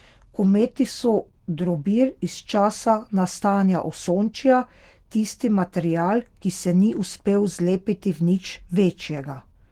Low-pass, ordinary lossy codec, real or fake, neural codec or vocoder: 19.8 kHz; Opus, 16 kbps; fake; autoencoder, 48 kHz, 128 numbers a frame, DAC-VAE, trained on Japanese speech